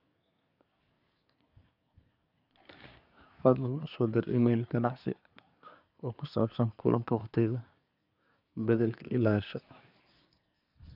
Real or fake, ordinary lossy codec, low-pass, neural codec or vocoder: fake; none; 5.4 kHz; codec, 24 kHz, 1 kbps, SNAC